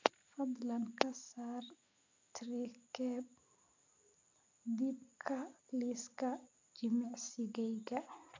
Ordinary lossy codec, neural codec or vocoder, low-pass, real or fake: MP3, 64 kbps; none; 7.2 kHz; real